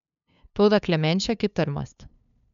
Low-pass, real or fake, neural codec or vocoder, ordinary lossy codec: 7.2 kHz; fake; codec, 16 kHz, 2 kbps, FunCodec, trained on LibriTTS, 25 frames a second; none